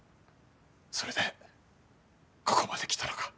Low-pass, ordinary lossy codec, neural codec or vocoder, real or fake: none; none; none; real